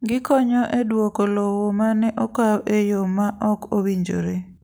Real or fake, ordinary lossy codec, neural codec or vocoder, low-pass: real; none; none; none